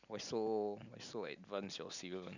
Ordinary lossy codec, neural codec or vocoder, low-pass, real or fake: none; none; 7.2 kHz; real